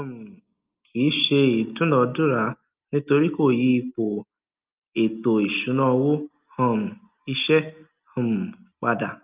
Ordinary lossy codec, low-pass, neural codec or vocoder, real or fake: Opus, 24 kbps; 3.6 kHz; none; real